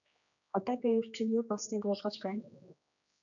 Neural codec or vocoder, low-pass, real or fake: codec, 16 kHz, 2 kbps, X-Codec, HuBERT features, trained on general audio; 7.2 kHz; fake